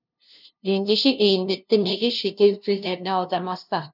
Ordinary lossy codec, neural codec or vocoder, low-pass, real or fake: none; codec, 16 kHz, 0.5 kbps, FunCodec, trained on LibriTTS, 25 frames a second; 5.4 kHz; fake